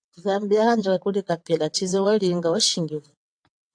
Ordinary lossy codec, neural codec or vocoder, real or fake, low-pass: AAC, 64 kbps; vocoder, 22.05 kHz, 80 mel bands, WaveNeXt; fake; 9.9 kHz